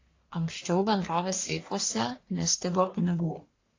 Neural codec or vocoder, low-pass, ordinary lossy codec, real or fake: codec, 44.1 kHz, 1.7 kbps, Pupu-Codec; 7.2 kHz; AAC, 32 kbps; fake